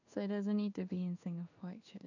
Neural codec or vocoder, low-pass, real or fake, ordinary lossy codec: codec, 16 kHz in and 24 kHz out, 1 kbps, XY-Tokenizer; 7.2 kHz; fake; none